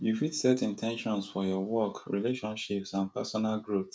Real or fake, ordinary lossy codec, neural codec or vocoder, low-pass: fake; none; codec, 16 kHz, 16 kbps, FreqCodec, smaller model; none